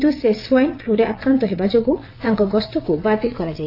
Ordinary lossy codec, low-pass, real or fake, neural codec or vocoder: AAC, 32 kbps; 5.4 kHz; fake; vocoder, 22.05 kHz, 80 mel bands, WaveNeXt